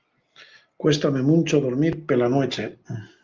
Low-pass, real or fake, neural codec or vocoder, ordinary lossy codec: 7.2 kHz; real; none; Opus, 32 kbps